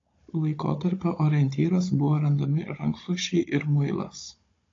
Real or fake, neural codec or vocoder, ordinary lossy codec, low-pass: fake; codec, 16 kHz, 16 kbps, FunCodec, trained on Chinese and English, 50 frames a second; AAC, 32 kbps; 7.2 kHz